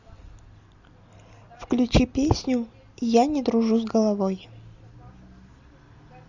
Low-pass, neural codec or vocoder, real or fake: 7.2 kHz; none; real